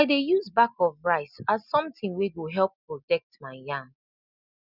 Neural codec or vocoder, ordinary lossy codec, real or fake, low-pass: none; none; real; 5.4 kHz